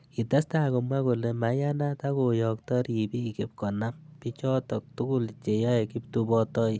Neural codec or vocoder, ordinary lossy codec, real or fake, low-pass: none; none; real; none